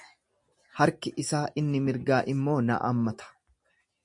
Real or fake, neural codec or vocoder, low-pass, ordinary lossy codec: real; none; 10.8 kHz; MP3, 48 kbps